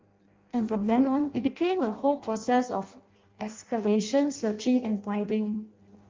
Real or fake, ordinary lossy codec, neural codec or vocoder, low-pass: fake; Opus, 32 kbps; codec, 16 kHz in and 24 kHz out, 0.6 kbps, FireRedTTS-2 codec; 7.2 kHz